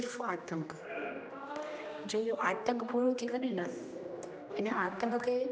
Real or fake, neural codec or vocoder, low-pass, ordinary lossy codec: fake; codec, 16 kHz, 1 kbps, X-Codec, HuBERT features, trained on general audio; none; none